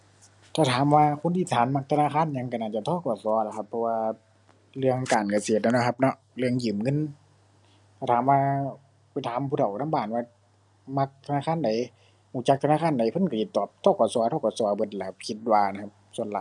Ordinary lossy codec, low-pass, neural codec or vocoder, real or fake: none; 10.8 kHz; none; real